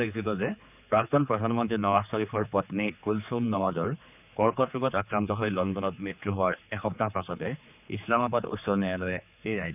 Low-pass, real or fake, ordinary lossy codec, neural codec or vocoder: 3.6 kHz; fake; none; codec, 16 kHz, 4 kbps, X-Codec, HuBERT features, trained on general audio